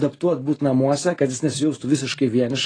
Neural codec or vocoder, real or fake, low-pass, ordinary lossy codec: none; real; 9.9 kHz; AAC, 32 kbps